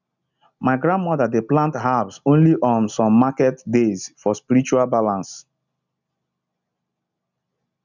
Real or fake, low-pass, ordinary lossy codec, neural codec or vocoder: real; 7.2 kHz; none; none